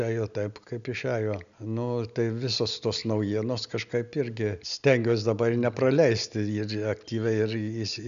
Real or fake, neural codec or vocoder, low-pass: real; none; 7.2 kHz